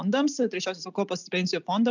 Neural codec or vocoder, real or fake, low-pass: none; real; 7.2 kHz